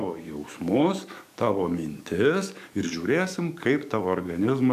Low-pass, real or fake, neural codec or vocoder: 14.4 kHz; fake; codec, 44.1 kHz, 7.8 kbps, DAC